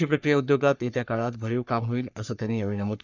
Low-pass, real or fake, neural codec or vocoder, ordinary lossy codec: 7.2 kHz; fake; codec, 44.1 kHz, 3.4 kbps, Pupu-Codec; none